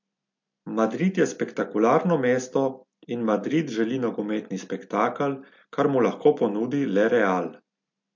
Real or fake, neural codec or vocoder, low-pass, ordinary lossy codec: real; none; 7.2 kHz; MP3, 48 kbps